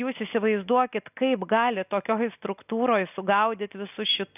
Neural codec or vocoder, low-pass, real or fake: none; 3.6 kHz; real